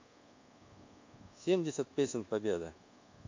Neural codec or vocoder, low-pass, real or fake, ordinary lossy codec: codec, 24 kHz, 1.2 kbps, DualCodec; 7.2 kHz; fake; AAC, 48 kbps